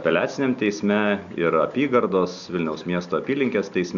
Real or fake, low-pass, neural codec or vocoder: real; 7.2 kHz; none